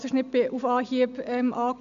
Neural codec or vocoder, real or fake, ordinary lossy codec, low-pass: none; real; none; 7.2 kHz